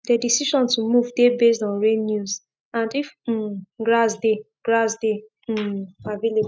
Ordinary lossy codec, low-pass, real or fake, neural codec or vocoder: none; none; real; none